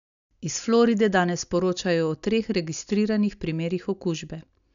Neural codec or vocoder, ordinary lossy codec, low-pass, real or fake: none; none; 7.2 kHz; real